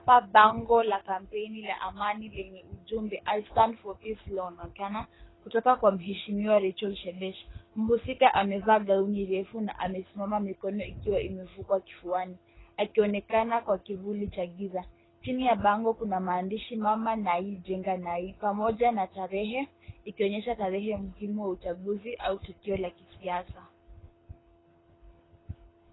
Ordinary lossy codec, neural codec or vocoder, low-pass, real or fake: AAC, 16 kbps; codec, 24 kHz, 6 kbps, HILCodec; 7.2 kHz; fake